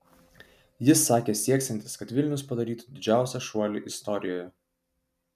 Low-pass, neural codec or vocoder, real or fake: 14.4 kHz; none; real